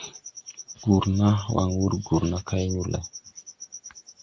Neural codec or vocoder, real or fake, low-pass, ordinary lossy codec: none; real; 7.2 kHz; Opus, 32 kbps